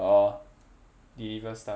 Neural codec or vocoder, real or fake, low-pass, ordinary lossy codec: none; real; none; none